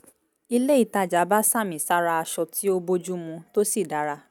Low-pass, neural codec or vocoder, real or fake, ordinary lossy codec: none; none; real; none